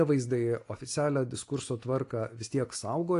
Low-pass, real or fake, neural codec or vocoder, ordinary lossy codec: 10.8 kHz; real; none; AAC, 48 kbps